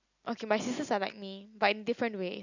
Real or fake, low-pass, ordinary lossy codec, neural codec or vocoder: real; 7.2 kHz; none; none